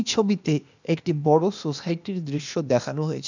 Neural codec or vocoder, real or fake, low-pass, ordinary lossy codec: codec, 16 kHz, 0.8 kbps, ZipCodec; fake; 7.2 kHz; none